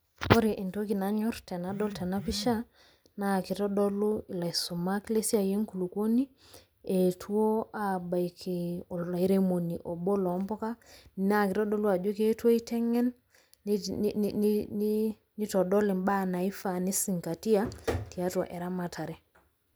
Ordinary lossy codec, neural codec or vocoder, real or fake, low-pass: none; none; real; none